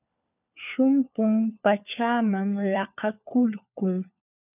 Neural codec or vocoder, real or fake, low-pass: codec, 16 kHz, 4 kbps, FunCodec, trained on LibriTTS, 50 frames a second; fake; 3.6 kHz